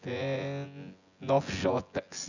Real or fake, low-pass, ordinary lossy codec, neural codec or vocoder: fake; 7.2 kHz; none; vocoder, 24 kHz, 100 mel bands, Vocos